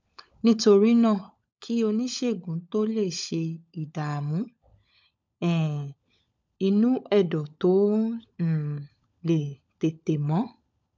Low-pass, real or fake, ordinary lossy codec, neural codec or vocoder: 7.2 kHz; fake; MP3, 64 kbps; codec, 16 kHz, 16 kbps, FunCodec, trained on LibriTTS, 50 frames a second